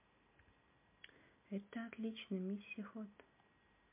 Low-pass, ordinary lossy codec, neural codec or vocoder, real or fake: 3.6 kHz; MP3, 32 kbps; none; real